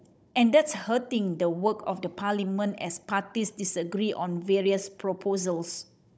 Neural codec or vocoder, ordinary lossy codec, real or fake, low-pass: codec, 16 kHz, 16 kbps, FunCodec, trained on Chinese and English, 50 frames a second; none; fake; none